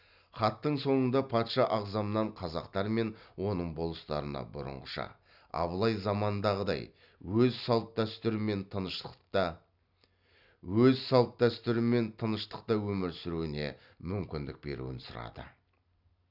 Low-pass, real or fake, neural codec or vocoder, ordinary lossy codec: 5.4 kHz; real; none; none